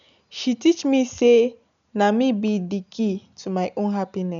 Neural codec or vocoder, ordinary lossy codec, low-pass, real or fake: none; none; 7.2 kHz; real